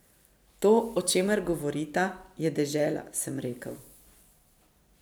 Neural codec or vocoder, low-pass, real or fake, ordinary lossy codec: vocoder, 44.1 kHz, 128 mel bands every 512 samples, BigVGAN v2; none; fake; none